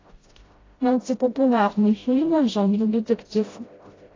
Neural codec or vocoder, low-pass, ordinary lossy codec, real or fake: codec, 16 kHz, 0.5 kbps, FreqCodec, smaller model; 7.2 kHz; AAC, 32 kbps; fake